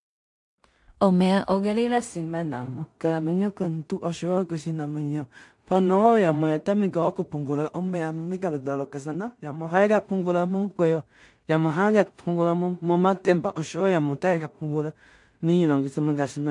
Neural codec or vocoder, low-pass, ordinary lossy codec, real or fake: codec, 16 kHz in and 24 kHz out, 0.4 kbps, LongCat-Audio-Codec, two codebook decoder; 10.8 kHz; MP3, 64 kbps; fake